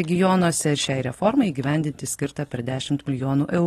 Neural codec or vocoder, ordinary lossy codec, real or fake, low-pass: none; AAC, 32 kbps; real; 19.8 kHz